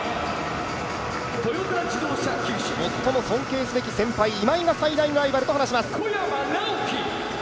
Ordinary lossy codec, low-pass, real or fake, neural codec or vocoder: none; none; real; none